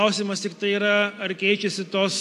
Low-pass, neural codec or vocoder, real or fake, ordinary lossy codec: 14.4 kHz; none; real; AAC, 64 kbps